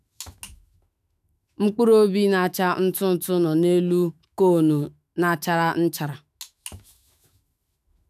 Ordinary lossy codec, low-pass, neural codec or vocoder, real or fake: none; 14.4 kHz; autoencoder, 48 kHz, 128 numbers a frame, DAC-VAE, trained on Japanese speech; fake